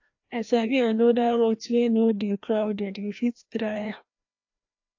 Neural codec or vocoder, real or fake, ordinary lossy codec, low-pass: codec, 16 kHz, 1 kbps, FreqCodec, larger model; fake; AAC, 48 kbps; 7.2 kHz